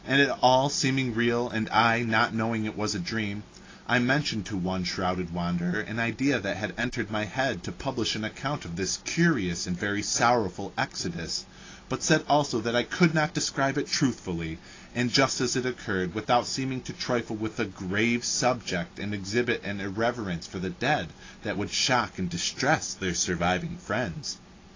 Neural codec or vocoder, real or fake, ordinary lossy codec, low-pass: none; real; AAC, 32 kbps; 7.2 kHz